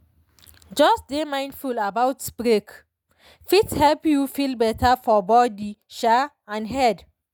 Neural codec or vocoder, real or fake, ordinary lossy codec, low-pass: none; real; none; none